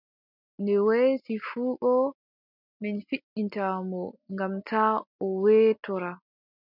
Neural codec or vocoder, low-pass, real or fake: none; 5.4 kHz; real